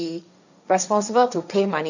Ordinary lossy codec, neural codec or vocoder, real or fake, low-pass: none; codec, 16 kHz, 1.1 kbps, Voila-Tokenizer; fake; 7.2 kHz